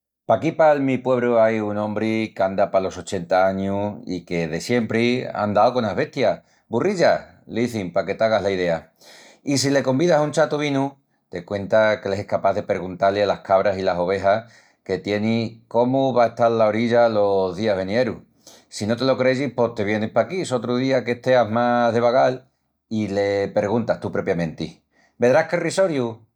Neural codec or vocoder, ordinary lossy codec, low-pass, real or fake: none; none; 19.8 kHz; real